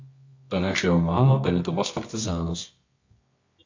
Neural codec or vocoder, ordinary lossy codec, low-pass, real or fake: codec, 24 kHz, 0.9 kbps, WavTokenizer, medium music audio release; MP3, 48 kbps; 7.2 kHz; fake